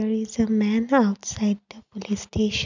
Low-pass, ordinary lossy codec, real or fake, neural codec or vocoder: 7.2 kHz; none; real; none